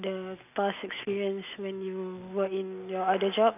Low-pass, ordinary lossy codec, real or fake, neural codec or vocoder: 3.6 kHz; none; real; none